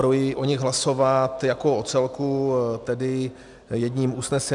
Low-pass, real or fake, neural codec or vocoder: 10.8 kHz; real; none